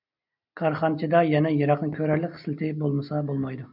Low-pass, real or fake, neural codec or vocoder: 5.4 kHz; real; none